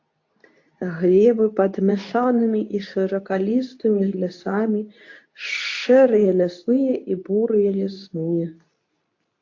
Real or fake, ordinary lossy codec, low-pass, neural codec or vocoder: fake; AAC, 48 kbps; 7.2 kHz; codec, 24 kHz, 0.9 kbps, WavTokenizer, medium speech release version 2